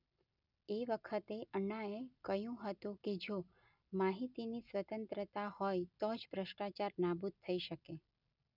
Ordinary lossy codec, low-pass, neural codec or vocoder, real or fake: none; 5.4 kHz; none; real